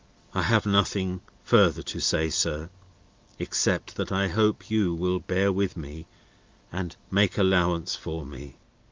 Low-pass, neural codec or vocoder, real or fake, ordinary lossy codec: 7.2 kHz; none; real; Opus, 32 kbps